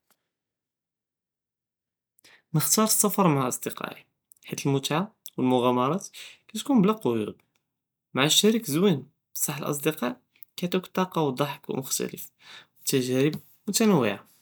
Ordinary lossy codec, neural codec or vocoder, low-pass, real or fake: none; none; none; real